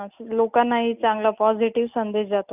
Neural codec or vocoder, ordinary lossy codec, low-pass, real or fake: none; none; 3.6 kHz; real